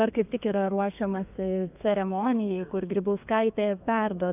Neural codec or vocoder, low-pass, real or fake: codec, 32 kHz, 1.9 kbps, SNAC; 3.6 kHz; fake